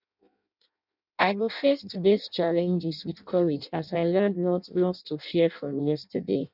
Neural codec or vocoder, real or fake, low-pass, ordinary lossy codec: codec, 16 kHz in and 24 kHz out, 0.6 kbps, FireRedTTS-2 codec; fake; 5.4 kHz; none